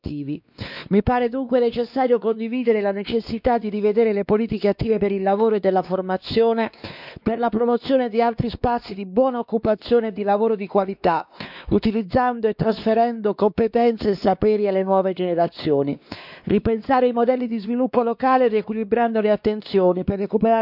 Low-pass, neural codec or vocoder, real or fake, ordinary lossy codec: 5.4 kHz; codec, 16 kHz, 2 kbps, X-Codec, WavLM features, trained on Multilingual LibriSpeech; fake; none